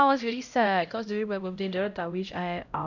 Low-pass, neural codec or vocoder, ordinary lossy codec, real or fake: 7.2 kHz; codec, 16 kHz, 0.5 kbps, X-Codec, HuBERT features, trained on LibriSpeech; none; fake